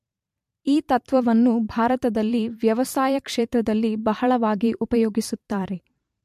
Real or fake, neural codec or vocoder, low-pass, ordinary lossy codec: fake; vocoder, 44.1 kHz, 128 mel bands every 512 samples, BigVGAN v2; 14.4 kHz; MP3, 64 kbps